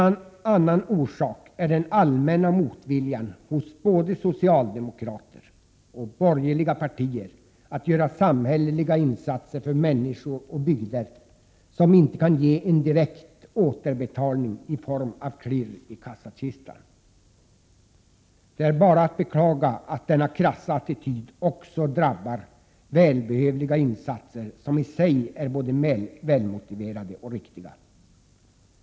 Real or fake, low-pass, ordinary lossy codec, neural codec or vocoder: real; none; none; none